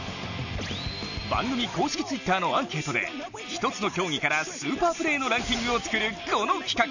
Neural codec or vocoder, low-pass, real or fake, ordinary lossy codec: none; 7.2 kHz; real; none